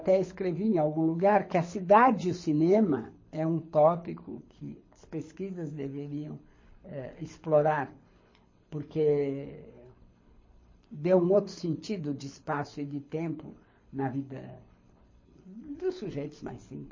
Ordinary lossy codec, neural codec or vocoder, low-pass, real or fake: MP3, 32 kbps; codec, 24 kHz, 6 kbps, HILCodec; 7.2 kHz; fake